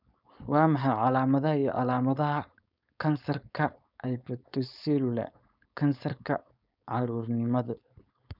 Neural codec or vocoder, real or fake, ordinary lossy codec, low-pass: codec, 16 kHz, 4.8 kbps, FACodec; fake; none; 5.4 kHz